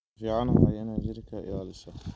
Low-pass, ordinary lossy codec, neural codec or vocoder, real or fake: none; none; none; real